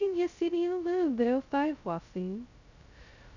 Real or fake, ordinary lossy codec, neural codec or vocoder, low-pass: fake; none; codec, 16 kHz, 0.2 kbps, FocalCodec; 7.2 kHz